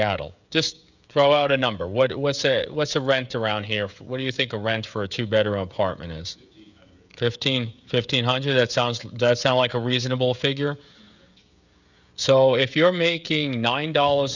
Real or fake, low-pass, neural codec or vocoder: fake; 7.2 kHz; codec, 16 kHz, 16 kbps, FreqCodec, smaller model